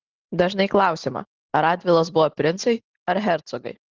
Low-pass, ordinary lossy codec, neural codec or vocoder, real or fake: 7.2 kHz; Opus, 16 kbps; none; real